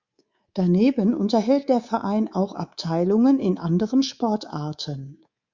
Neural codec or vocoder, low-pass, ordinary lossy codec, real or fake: codec, 24 kHz, 3.1 kbps, DualCodec; 7.2 kHz; Opus, 64 kbps; fake